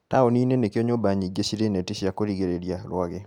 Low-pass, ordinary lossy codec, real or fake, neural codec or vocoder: 19.8 kHz; none; real; none